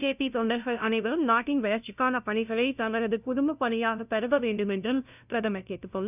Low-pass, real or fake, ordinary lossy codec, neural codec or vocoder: 3.6 kHz; fake; none; codec, 16 kHz, 0.5 kbps, FunCodec, trained on LibriTTS, 25 frames a second